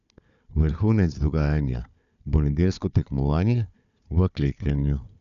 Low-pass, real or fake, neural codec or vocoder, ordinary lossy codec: 7.2 kHz; fake; codec, 16 kHz, 4 kbps, FunCodec, trained on Chinese and English, 50 frames a second; none